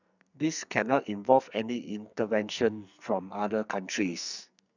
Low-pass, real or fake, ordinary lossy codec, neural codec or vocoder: 7.2 kHz; fake; none; codec, 44.1 kHz, 2.6 kbps, SNAC